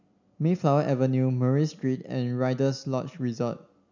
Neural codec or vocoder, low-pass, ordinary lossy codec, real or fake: none; 7.2 kHz; none; real